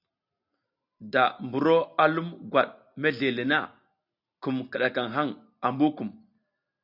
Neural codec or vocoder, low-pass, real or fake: none; 5.4 kHz; real